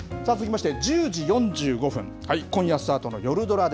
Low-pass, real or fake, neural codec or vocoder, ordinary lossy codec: none; real; none; none